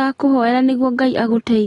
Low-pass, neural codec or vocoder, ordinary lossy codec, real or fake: 10.8 kHz; none; AAC, 32 kbps; real